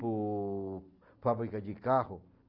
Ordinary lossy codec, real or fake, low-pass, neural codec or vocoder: Opus, 24 kbps; real; 5.4 kHz; none